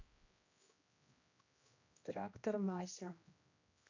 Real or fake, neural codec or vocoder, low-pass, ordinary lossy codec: fake; codec, 16 kHz, 1 kbps, X-Codec, HuBERT features, trained on general audio; 7.2 kHz; AAC, 48 kbps